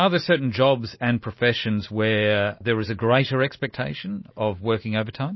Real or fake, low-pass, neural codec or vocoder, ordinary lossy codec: real; 7.2 kHz; none; MP3, 24 kbps